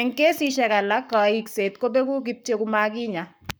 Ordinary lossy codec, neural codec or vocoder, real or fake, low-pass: none; codec, 44.1 kHz, 7.8 kbps, Pupu-Codec; fake; none